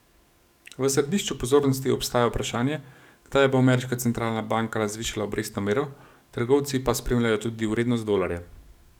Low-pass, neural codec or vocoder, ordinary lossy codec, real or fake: 19.8 kHz; codec, 44.1 kHz, 7.8 kbps, DAC; none; fake